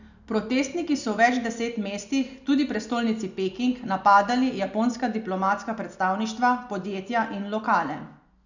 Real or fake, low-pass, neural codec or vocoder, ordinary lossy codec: real; 7.2 kHz; none; none